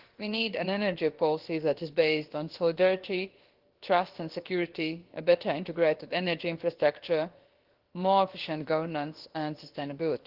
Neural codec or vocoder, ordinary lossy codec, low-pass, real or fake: codec, 16 kHz, about 1 kbps, DyCAST, with the encoder's durations; Opus, 16 kbps; 5.4 kHz; fake